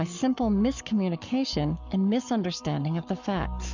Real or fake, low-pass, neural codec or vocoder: fake; 7.2 kHz; codec, 44.1 kHz, 7.8 kbps, Pupu-Codec